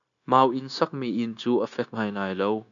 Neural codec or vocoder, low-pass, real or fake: codec, 16 kHz, 0.9 kbps, LongCat-Audio-Codec; 7.2 kHz; fake